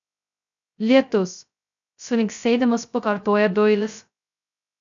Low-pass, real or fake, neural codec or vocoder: 7.2 kHz; fake; codec, 16 kHz, 0.2 kbps, FocalCodec